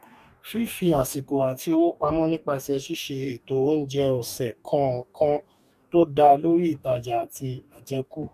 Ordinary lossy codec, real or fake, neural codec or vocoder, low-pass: none; fake; codec, 44.1 kHz, 2.6 kbps, DAC; 14.4 kHz